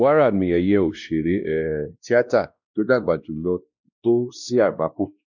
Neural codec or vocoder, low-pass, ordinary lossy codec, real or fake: codec, 16 kHz, 1 kbps, X-Codec, WavLM features, trained on Multilingual LibriSpeech; 7.2 kHz; none; fake